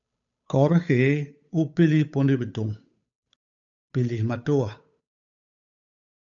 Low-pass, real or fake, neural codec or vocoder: 7.2 kHz; fake; codec, 16 kHz, 8 kbps, FunCodec, trained on Chinese and English, 25 frames a second